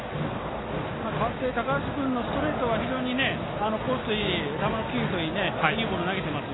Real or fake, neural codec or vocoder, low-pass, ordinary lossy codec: real; none; 7.2 kHz; AAC, 16 kbps